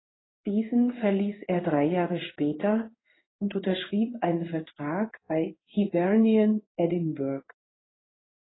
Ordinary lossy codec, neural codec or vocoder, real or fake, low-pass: AAC, 16 kbps; codec, 16 kHz in and 24 kHz out, 1 kbps, XY-Tokenizer; fake; 7.2 kHz